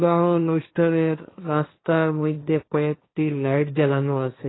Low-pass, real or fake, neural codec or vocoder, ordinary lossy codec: 7.2 kHz; fake; codec, 16 kHz, 1.1 kbps, Voila-Tokenizer; AAC, 16 kbps